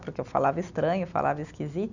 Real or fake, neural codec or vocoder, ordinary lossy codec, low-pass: real; none; none; 7.2 kHz